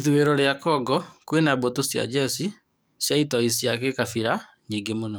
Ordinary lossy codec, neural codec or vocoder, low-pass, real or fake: none; codec, 44.1 kHz, 7.8 kbps, DAC; none; fake